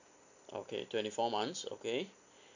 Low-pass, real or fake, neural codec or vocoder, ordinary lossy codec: 7.2 kHz; real; none; none